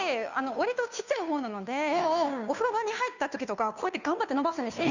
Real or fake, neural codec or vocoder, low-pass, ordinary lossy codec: fake; codec, 16 kHz, 2 kbps, FunCodec, trained on Chinese and English, 25 frames a second; 7.2 kHz; none